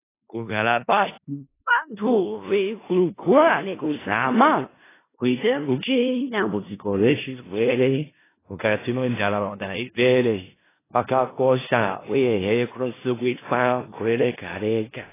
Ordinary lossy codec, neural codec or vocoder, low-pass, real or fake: AAC, 16 kbps; codec, 16 kHz in and 24 kHz out, 0.4 kbps, LongCat-Audio-Codec, four codebook decoder; 3.6 kHz; fake